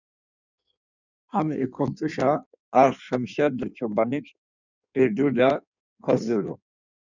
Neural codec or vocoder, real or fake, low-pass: codec, 16 kHz in and 24 kHz out, 1.1 kbps, FireRedTTS-2 codec; fake; 7.2 kHz